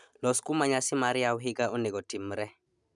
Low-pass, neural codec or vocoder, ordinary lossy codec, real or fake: 10.8 kHz; none; none; real